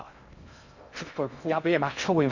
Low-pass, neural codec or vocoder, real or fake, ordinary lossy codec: 7.2 kHz; codec, 16 kHz in and 24 kHz out, 0.6 kbps, FocalCodec, streaming, 2048 codes; fake; none